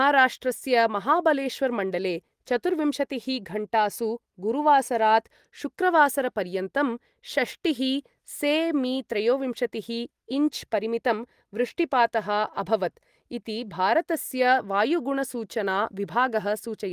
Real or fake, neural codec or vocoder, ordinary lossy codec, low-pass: real; none; Opus, 24 kbps; 14.4 kHz